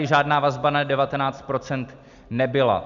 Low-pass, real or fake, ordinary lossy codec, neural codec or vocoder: 7.2 kHz; real; MP3, 96 kbps; none